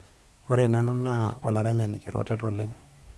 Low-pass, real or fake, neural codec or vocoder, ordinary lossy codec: none; fake; codec, 24 kHz, 1 kbps, SNAC; none